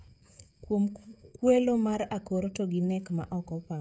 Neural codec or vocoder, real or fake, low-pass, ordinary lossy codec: codec, 16 kHz, 16 kbps, FreqCodec, smaller model; fake; none; none